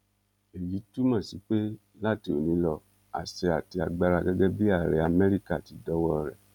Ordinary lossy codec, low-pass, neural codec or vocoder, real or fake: none; 19.8 kHz; none; real